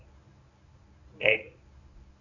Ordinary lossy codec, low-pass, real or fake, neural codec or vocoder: none; 7.2 kHz; fake; codec, 24 kHz, 0.9 kbps, WavTokenizer, medium speech release version 1